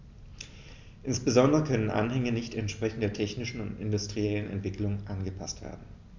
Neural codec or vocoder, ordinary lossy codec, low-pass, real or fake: none; MP3, 64 kbps; 7.2 kHz; real